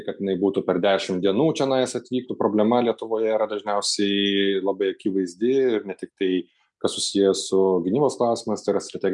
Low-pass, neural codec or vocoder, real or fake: 10.8 kHz; none; real